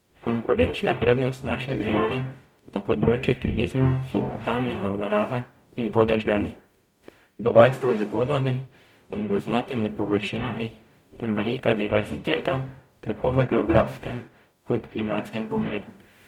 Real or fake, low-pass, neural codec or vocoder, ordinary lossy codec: fake; 19.8 kHz; codec, 44.1 kHz, 0.9 kbps, DAC; MP3, 96 kbps